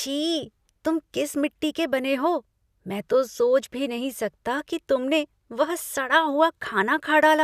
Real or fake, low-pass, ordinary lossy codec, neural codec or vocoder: real; 14.4 kHz; none; none